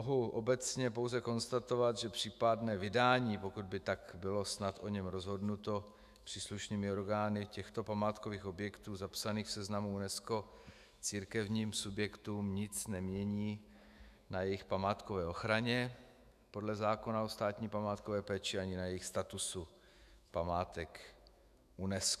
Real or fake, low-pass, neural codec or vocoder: fake; 14.4 kHz; autoencoder, 48 kHz, 128 numbers a frame, DAC-VAE, trained on Japanese speech